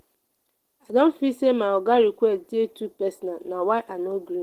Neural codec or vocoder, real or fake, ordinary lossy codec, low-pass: none; real; Opus, 16 kbps; 14.4 kHz